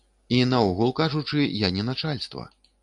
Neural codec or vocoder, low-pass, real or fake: none; 10.8 kHz; real